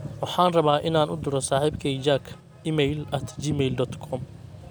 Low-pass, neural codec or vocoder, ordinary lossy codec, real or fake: none; none; none; real